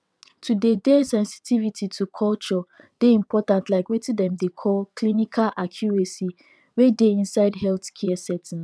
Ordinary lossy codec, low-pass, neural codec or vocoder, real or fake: none; none; vocoder, 22.05 kHz, 80 mel bands, WaveNeXt; fake